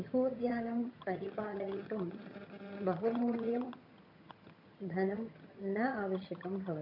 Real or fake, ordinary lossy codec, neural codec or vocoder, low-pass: fake; none; vocoder, 22.05 kHz, 80 mel bands, HiFi-GAN; 5.4 kHz